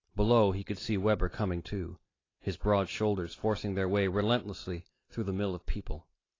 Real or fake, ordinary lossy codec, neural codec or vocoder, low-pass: real; AAC, 32 kbps; none; 7.2 kHz